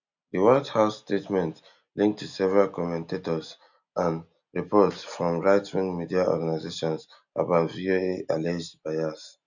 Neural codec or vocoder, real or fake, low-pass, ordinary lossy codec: none; real; 7.2 kHz; none